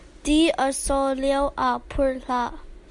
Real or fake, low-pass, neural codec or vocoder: real; 10.8 kHz; none